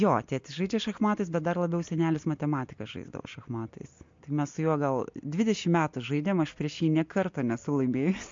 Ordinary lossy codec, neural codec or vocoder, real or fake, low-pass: MP3, 48 kbps; none; real; 7.2 kHz